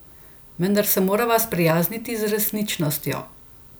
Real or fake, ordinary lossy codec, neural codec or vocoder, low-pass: real; none; none; none